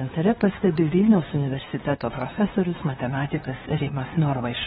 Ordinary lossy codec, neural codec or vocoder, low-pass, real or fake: AAC, 16 kbps; codec, 16 kHz, 2 kbps, FunCodec, trained on LibriTTS, 25 frames a second; 7.2 kHz; fake